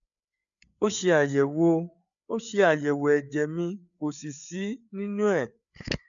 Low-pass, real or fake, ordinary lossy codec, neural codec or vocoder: 7.2 kHz; fake; none; codec, 16 kHz, 4 kbps, FreqCodec, larger model